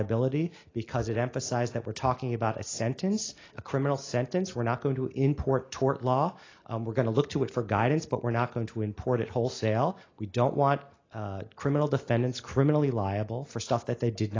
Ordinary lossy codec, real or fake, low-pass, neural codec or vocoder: AAC, 32 kbps; real; 7.2 kHz; none